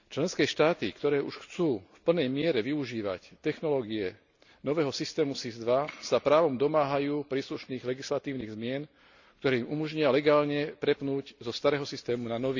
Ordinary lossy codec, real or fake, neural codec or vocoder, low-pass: none; real; none; 7.2 kHz